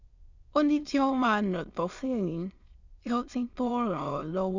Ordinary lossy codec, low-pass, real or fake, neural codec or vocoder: Opus, 64 kbps; 7.2 kHz; fake; autoencoder, 22.05 kHz, a latent of 192 numbers a frame, VITS, trained on many speakers